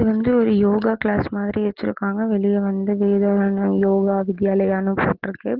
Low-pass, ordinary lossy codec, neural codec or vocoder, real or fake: 5.4 kHz; Opus, 16 kbps; none; real